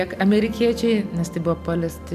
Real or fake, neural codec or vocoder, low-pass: real; none; 14.4 kHz